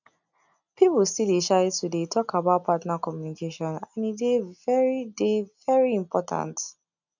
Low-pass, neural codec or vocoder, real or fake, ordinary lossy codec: 7.2 kHz; none; real; none